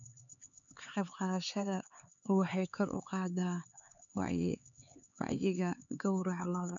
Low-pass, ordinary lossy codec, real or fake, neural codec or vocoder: 7.2 kHz; none; fake; codec, 16 kHz, 4 kbps, X-Codec, HuBERT features, trained on LibriSpeech